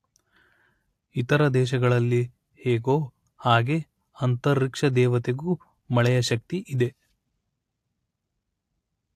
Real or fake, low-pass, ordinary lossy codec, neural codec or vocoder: real; 14.4 kHz; AAC, 64 kbps; none